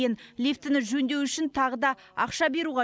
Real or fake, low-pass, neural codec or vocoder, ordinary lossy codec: real; none; none; none